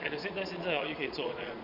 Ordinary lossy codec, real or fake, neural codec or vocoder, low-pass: MP3, 48 kbps; fake; vocoder, 22.05 kHz, 80 mel bands, Vocos; 5.4 kHz